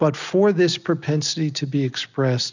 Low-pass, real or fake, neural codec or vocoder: 7.2 kHz; real; none